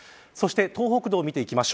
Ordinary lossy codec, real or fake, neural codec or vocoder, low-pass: none; real; none; none